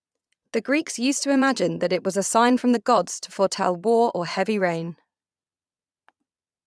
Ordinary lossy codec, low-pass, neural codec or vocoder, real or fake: none; none; vocoder, 22.05 kHz, 80 mel bands, Vocos; fake